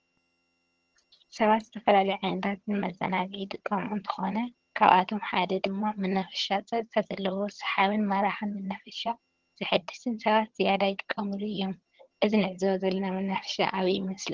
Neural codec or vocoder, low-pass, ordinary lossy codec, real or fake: vocoder, 22.05 kHz, 80 mel bands, HiFi-GAN; 7.2 kHz; Opus, 16 kbps; fake